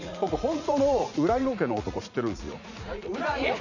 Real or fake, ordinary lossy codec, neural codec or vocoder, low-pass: fake; none; vocoder, 44.1 kHz, 80 mel bands, Vocos; 7.2 kHz